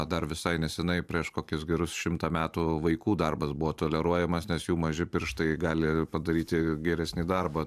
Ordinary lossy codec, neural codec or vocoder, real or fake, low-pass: AAC, 96 kbps; vocoder, 44.1 kHz, 128 mel bands every 512 samples, BigVGAN v2; fake; 14.4 kHz